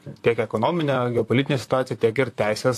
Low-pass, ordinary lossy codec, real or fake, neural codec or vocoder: 14.4 kHz; AAC, 64 kbps; fake; vocoder, 44.1 kHz, 128 mel bands, Pupu-Vocoder